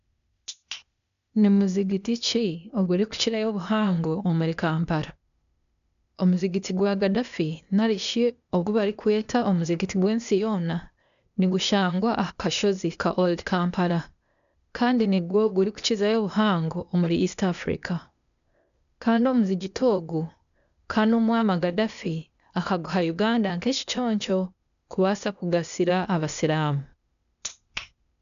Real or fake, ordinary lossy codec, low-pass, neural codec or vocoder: fake; none; 7.2 kHz; codec, 16 kHz, 0.8 kbps, ZipCodec